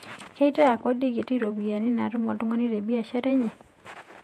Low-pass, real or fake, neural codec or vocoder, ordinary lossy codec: 14.4 kHz; fake; vocoder, 48 kHz, 128 mel bands, Vocos; MP3, 64 kbps